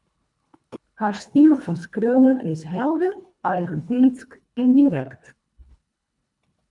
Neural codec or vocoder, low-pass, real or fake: codec, 24 kHz, 1.5 kbps, HILCodec; 10.8 kHz; fake